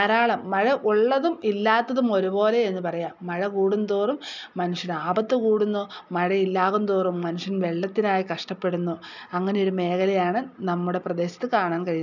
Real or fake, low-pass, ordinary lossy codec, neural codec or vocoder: real; 7.2 kHz; none; none